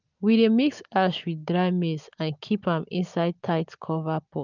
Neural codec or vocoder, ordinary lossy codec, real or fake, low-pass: none; none; real; 7.2 kHz